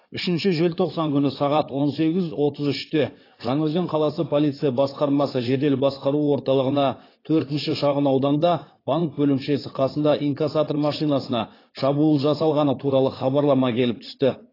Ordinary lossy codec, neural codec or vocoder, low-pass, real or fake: AAC, 24 kbps; codec, 16 kHz in and 24 kHz out, 2.2 kbps, FireRedTTS-2 codec; 5.4 kHz; fake